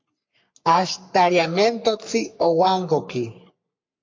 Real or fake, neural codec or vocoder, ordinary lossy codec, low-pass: fake; codec, 44.1 kHz, 3.4 kbps, Pupu-Codec; MP3, 48 kbps; 7.2 kHz